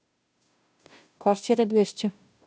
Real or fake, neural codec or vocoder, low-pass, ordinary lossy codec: fake; codec, 16 kHz, 0.5 kbps, FunCodec, trained on Chinese and English, 25 frames a second; none; none